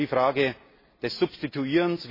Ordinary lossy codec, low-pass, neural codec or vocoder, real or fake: MP3, 24 kbps; 5.4 kHz; none; real